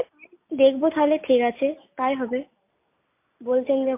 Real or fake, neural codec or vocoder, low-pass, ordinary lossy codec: real; none; 3.6 kHz; MP3, 24 kbps